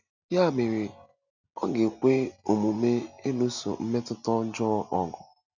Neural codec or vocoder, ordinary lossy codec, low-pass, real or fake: none; none; 7.2 kHz; real